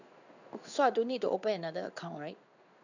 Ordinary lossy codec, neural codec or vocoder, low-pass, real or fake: none; codec, 16 kHz in and 24 kHz out, 1 kbps, XY-Tokenizer; 7.2 kHz; fake